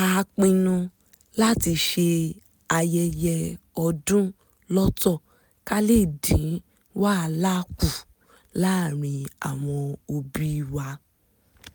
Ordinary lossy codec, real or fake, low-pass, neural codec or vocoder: none; real; none; none